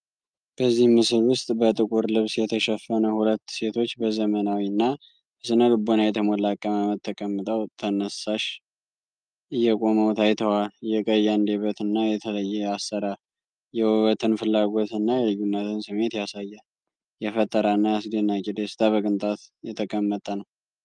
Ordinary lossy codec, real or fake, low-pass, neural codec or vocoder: Opus, 32 kbps; real; 9.9 kHz; none